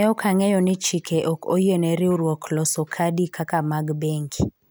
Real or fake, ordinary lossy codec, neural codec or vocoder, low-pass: real; none; none; none